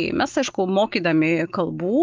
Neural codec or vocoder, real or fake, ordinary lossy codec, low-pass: none; real; Opus, 24 kbps; 7.2 kHz